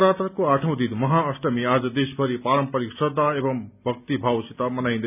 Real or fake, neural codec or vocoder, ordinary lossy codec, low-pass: real; none; none; 3.6 kHz